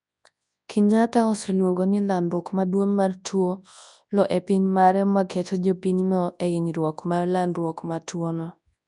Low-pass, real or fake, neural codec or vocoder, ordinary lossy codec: 10.8 kHz; fake; codec, 24 kHz, 0.9 kbps, WavTokenizer, large speech release; none